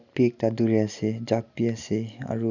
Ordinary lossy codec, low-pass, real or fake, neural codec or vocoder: none; 7.2 kHz; real; none